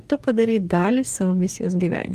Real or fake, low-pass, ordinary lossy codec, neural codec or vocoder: fake; 14.4 kHz; Opus, 32 kbps; codec, 44.1 kHz, 2.6 kbps, DAC